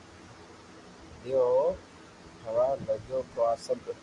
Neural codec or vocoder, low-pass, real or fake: none; 10.8 kHz; real